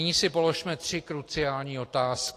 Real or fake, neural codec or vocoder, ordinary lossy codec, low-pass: real; none; AAC, 48 kbps; 14.4 kHz